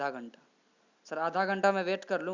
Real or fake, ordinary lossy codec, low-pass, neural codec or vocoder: real; Opus, 64 kbps; 7.2 kHz; none